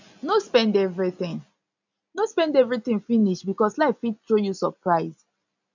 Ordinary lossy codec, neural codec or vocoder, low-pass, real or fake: none; none; 7.2 kHz; real